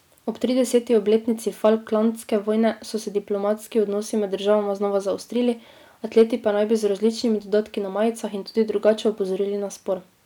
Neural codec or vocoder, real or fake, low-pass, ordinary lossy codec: none; real; 19.8 kHz; none